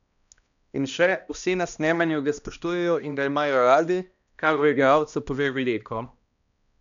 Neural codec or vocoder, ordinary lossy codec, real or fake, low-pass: codec, 16 kHz, 1 kbps, X-Codec, HuBERT features, trained on balanced general audio; none; fake; 7.2 kHz